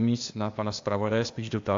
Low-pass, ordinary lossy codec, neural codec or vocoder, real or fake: 7.2 kHz; AAC, 64 kbps; codec, 16 kHz, 0.8 kbps, ZipCodec; fake